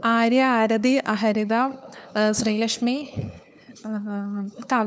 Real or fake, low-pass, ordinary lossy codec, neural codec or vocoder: fake; none; none; codec, 16 kHz, 4 kbps, FunCodec, trained on LibriTTS, 50 frames a second